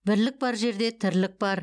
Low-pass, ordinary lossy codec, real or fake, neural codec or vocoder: 9.9 kHz; none; real; none